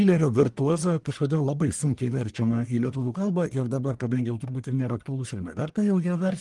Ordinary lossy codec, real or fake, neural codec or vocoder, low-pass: Opus, 24 kbps; fake; codec, 44.1 kHz, 1.7 kbps, Pupu-Codec; 10.8 kHz